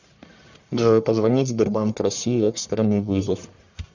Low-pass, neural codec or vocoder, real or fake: 7.2 kHz; codec, 44.1 kHz, 1.7 kbps, Pupu-Codec; fake